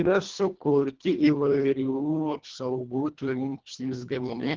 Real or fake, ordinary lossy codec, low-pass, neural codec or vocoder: fake; Opus, 16 kbps; 7.2 kHz; codec, 24 kHz, 1.5 kbps, HILCodec